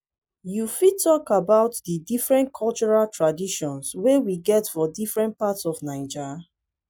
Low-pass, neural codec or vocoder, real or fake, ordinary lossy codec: 19.8 kHz; none; real; none